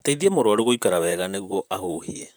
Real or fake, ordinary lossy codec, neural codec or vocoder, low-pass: fake; none; vocoder, 44.1 kHz, 128 mel bands, Pupu-Vocoder; none